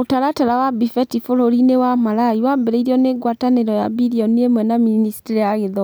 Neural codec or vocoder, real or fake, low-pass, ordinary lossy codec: none; real; none; none